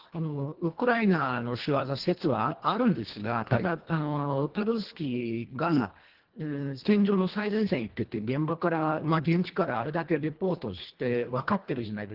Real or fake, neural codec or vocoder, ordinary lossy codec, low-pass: fake; codec, 24 kHz, 1.5 kbps, HILCodec; Opus, 16 kbps; 5.4 kHz